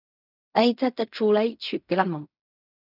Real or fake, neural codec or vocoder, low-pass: fake; codec, 16 kHz in and 24 kHz out, 0.4 kbps, LongCat-Audio-Codec, fine tuned four codebook decoder; 5.4 kHz